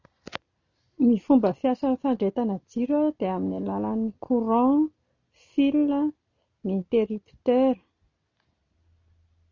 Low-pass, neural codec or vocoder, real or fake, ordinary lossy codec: 7.2 kHz; none; real; none